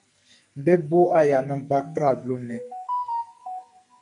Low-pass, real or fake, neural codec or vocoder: 10.8 kHz; fake; codec, 44.1 kHz, 2.6 kbps, SNAC